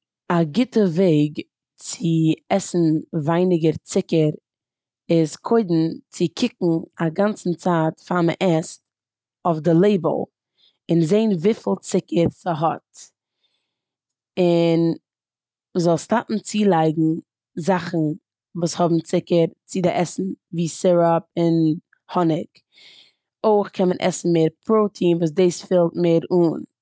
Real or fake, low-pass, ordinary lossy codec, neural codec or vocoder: real; none; none; none